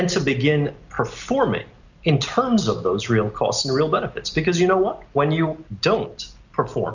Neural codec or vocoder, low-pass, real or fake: none; 7.2 kHz; real